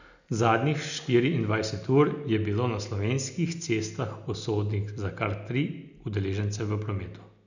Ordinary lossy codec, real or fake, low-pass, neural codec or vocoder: none; real; 7.2 kHz; none